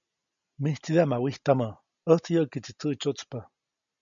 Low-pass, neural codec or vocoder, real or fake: 7.2 kHz; none; real